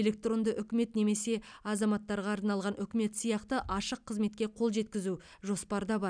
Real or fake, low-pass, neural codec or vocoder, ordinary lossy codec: real; 9.9 kHz; none; none